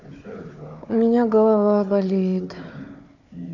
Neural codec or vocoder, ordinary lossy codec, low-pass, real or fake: codec, 16 kHz, 4 kbps, FunCodec, trained on Chinese and English, 50 frames a second; none; 7.2 kHz; fake